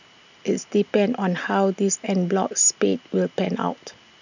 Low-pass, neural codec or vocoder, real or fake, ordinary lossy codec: 7.2 kHz; none; real; none